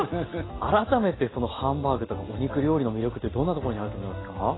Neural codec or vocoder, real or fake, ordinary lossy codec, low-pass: none; real; AAC, 16 kbps; 7.2 kHz